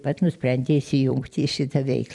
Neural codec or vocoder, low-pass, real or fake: vocoder, 44.1 kHz, 128 mel bands every 256 samples, BigVGAN v2; 10.8 kHz; fake